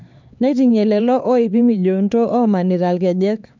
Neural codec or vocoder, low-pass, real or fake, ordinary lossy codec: codec, 16 kHz, 4 kbps, X-Codec, HuBERT features, trained on LibriSpeech; 7.2 kHz; fake; MP3, 64 kbps